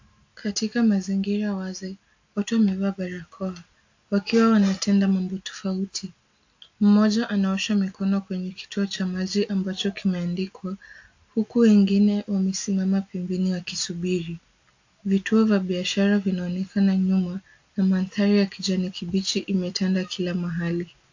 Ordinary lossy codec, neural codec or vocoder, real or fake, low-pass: AAC, 48 kbps; none; real; 7.2 kHz